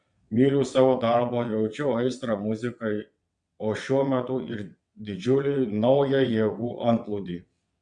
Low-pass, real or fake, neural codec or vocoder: 9.9 kHz; fake; vocoder, 22.05 kHz, 80 mel bands, WaveNeXt